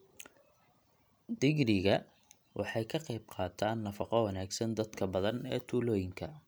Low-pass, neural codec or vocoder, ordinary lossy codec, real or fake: none; none; none; real